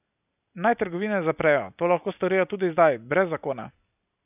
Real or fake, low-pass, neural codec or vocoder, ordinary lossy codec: real; 3.6 kHz; none; none